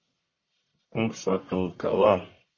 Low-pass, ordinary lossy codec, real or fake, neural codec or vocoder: 7.2 kHz; MP3, 32 kbps; fake; codec, 44.1 kHz, 1.7 kbps, Pupu-Codec